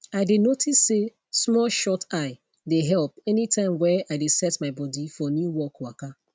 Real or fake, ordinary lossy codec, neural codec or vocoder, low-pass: real; none; none; none